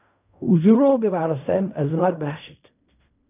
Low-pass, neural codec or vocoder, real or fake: 3.6 kHz; codec, 16 kHz in and 24 kHz out, 0.4 kbps, LongCat-Audio-Codec, fine tuned four codebook decoder; fake